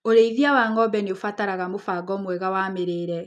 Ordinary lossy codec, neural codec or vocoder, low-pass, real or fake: none; none; none; real